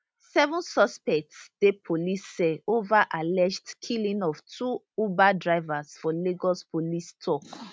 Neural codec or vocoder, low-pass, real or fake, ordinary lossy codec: none; none; real; none